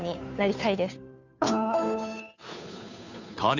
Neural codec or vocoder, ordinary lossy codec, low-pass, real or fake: codec, 16 kHz, 2 kbps, FunCodec, trained on Chinese and English, 25 frames a second; none; 7.2 kHz; fake